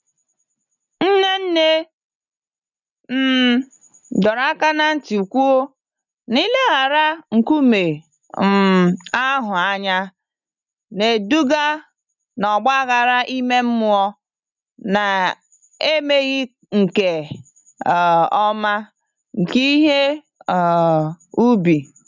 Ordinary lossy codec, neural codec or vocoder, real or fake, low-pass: none; none; real; 7.2 kHz